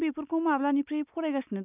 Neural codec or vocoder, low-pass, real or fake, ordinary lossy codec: none; 3.6 kHz; real; none